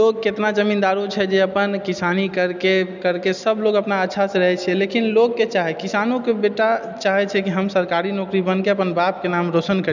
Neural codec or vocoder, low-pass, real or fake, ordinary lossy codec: none; 7.2 kHz; real; none